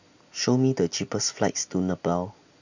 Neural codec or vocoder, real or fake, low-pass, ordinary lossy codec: none; real; 7.2 kHz; none